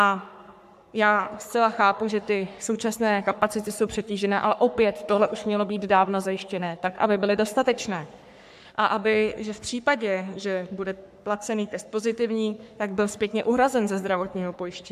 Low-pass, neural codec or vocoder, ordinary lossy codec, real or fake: 14.4 kHz; codec, 44.1 kHz, 3.4 kbps, Pupu-Codec; AAC, 96 kbps; fake